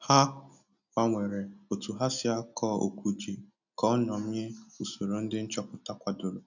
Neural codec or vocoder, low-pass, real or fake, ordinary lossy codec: none; 7.2 kHz; real; none